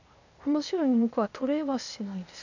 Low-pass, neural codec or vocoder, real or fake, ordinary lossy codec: 7.2 kHz; codec, 16 kHz, 0.8 kbps, ZipCodec; fake; none